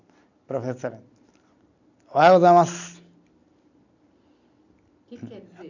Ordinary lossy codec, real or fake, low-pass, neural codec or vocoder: none; real; 7.2 kHz; none